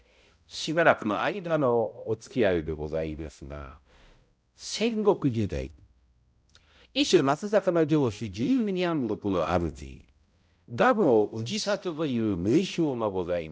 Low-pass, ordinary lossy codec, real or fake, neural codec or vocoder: none; none; fake; codec, 16 kHz, 0.5 kbps, X-Codec, HuBERT features, trained on balanced general audio